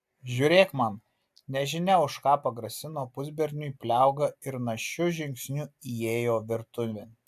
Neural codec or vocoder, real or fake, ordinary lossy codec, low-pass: none; real; AAC, 96 kbps; 14.4 kHz